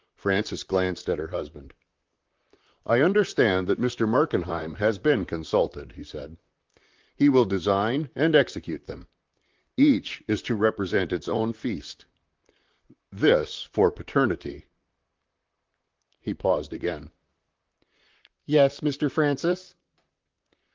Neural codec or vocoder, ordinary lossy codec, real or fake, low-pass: vocoder, 44.1 kHz, 128 mel bands, Pupu-Vocoder; Opus, 32 kbps; fake; 7.2 kHz